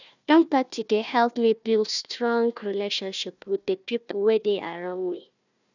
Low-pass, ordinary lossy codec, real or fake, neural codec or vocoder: 7.2 kHz; none; fake; codec, 16 kHz, 1 kbps, FunCodec, trained on Chinese and English, 50 frames a second